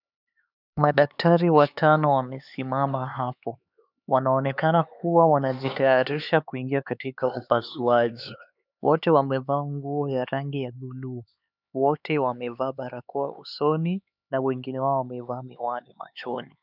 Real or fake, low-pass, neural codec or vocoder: fake; 5.4 kHz; codec, 16 kHz, 4 kbps, X-Codec, HuBERT features, trained on LibriSpeech